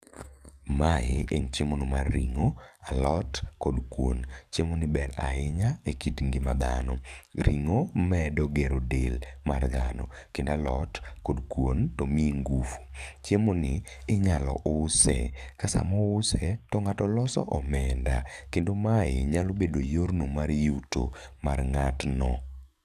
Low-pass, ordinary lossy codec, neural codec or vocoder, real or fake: 14.4 kHz; none; codec, 44.1 kHz, 7.8 kbps, DAC; fake